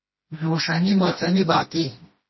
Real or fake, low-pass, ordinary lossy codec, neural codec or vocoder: fake; 7.2 kHz; MP3, 24 kbps; codec, 16 kHz, 1 kbps, FreqCodec, smaller model